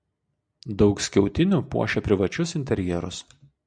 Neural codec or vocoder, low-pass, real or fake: none; 9.9 kHz; real